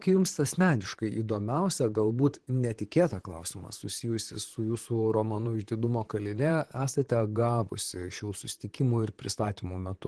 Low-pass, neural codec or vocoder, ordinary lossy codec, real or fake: 10.8 kHz; vocoder, 44.1 kHz, 128 mel bands, Pupu-Vocoder; Opus, 16 kbps; fake